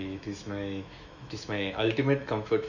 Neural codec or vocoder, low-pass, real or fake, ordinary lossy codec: autoencoder, 48 kHz, 128 numbers a frame, DAC-VAE, trained on Japanese speech; 7.2 kHz; fake; none